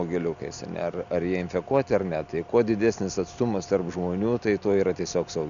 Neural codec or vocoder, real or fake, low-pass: none; real; 7.2 kHz